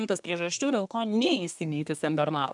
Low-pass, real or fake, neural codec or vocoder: 10.8 kHz; fake; codec, 24 kHz, 1 kbps, SNAC